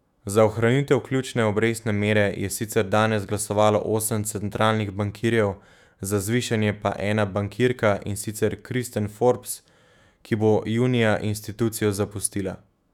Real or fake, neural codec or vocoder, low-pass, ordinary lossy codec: fake; autoencoder, 48 kHz, 128 numbers a frame, DAC-VAE, trained on Japanese speech; 19.8 kHz; none